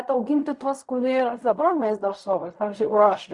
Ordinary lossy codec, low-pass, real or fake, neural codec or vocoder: Opus, 64 kbps; 10.8 kHz; fake; codec, 16 kHz in and 24 kHz out, 0.4 kbps, LongCat-Audio-Codec, fine tuned four codebook decoder